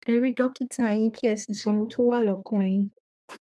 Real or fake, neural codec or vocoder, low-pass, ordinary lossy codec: fake; codec, 24 kHz, 1 kbps, SNAC; none; none